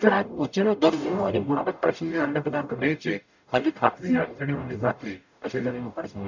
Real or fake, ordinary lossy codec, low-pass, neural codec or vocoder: fake; none; 7.2 kHz; codec, 44.1 kHz, 0.9 kbps, DAC